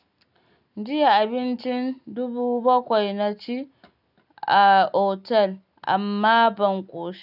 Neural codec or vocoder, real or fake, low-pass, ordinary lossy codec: none; real; 5.4 kHz; none